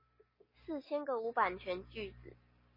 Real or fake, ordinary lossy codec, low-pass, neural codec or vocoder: real; MP3, 24 kbps; 5.4 kHz; none